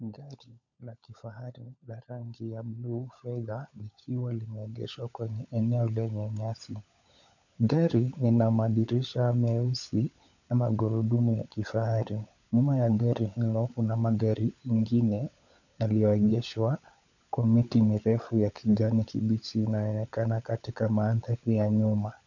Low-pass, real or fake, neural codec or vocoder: 7.2 kHz; fake; codec, 16 kHz, 16 kbps, FunCodec, trained on LibriTTS, 50 frames a second